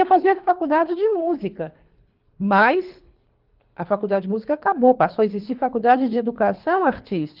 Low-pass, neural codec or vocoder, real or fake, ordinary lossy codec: 5.4 kHz; codec, 24 kHz, 3 kbps, HILCodec; fake; Opus, 32 kbps